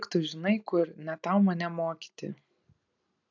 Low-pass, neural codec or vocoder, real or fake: 7.2 kHz; none; real